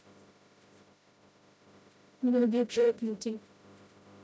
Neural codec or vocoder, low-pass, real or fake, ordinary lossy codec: codec, 16 kHz, 0.5 kbps, FreqCodec, smaller model; none; fake; none